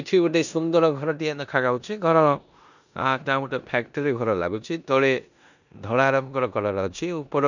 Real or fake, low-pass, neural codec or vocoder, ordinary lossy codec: fake; 7.2 kHz; codec, 16 kHz in and 24 kHz out, 0.9 kbps, LongCat-Audio-Codec, four codebook decoder; none